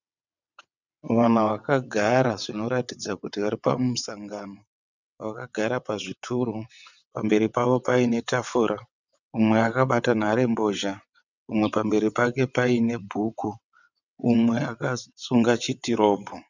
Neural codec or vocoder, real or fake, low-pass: vocoder, 22.05 kHz, 80 mel bands, WaveNeXt; fake; 7.2 kHz